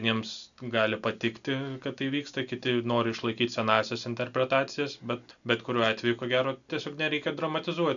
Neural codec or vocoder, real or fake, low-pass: none; real; 7.2 kHz